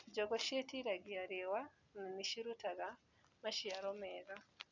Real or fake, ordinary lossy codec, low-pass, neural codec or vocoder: real; none; 7.2 kHz; none